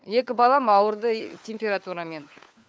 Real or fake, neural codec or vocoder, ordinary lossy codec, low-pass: fake; codec, 16 kHz, 4 kbps, FunCodec, trained on LibriTTS, 50 frames a second; none; none